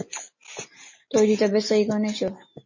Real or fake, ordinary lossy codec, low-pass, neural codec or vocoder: real; MP3, 32 kbps; 7.2 kHz; none